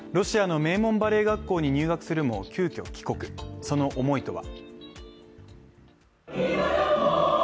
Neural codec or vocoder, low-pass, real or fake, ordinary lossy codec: none; none; real; none